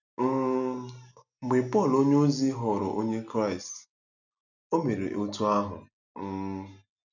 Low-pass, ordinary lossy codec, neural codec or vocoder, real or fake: 7.2 kHz; none; none; real